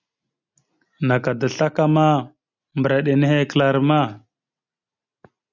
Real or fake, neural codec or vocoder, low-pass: real; none; 7.2 kHz